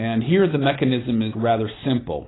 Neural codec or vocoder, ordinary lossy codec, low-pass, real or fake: none; AAC, 16 kbps; 7.2 kHz; real